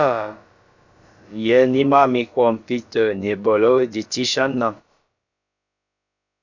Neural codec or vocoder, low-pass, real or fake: codec, 16 kHz, about 1 kbps, DyCAST, with the encoder's durations; 7.2 kHz; fake